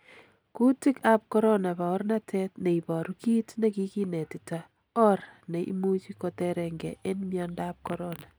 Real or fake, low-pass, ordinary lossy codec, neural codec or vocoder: real; none; none; none